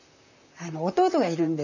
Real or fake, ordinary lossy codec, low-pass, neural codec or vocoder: fake; none; 7.2 kHz; vocoder, 44.1 kHz, 128 mel bands, Pupu-Vocoder